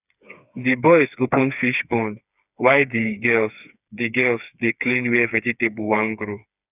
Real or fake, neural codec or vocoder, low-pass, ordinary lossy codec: fake; codec, 16 kHz, 4 kbps, FreqCodec, smaller model; 3.6 kHz; none